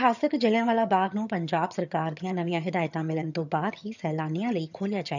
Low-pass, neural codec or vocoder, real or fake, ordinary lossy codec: 7.2 kHz; vocoder, 22.05 kHz, 80 mel bands, HiFi-GAN; fake; none